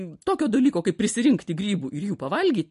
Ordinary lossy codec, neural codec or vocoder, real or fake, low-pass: MP3, 48 kbps; none; real; 14.4 kHz